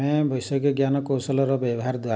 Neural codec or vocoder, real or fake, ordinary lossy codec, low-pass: none; real; none; none